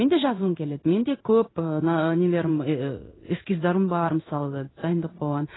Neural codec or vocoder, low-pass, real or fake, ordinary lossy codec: codec, 16 kHz in and 24 kHz out, 1 kbps, XY-Tokenizer; 7.2 kHz; fake; AAC, 16 kbps